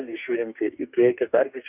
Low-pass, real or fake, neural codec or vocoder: 3.6 kHz; fake; codec, 44.1 kHz, 2.6 kbps, DAC